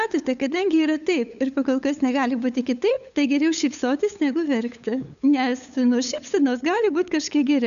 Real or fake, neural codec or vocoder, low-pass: fake; codec, 16 kHz, 8 kbps, FunCodec, trained on LibriTTS, 25 frames a second; 7.2 kHz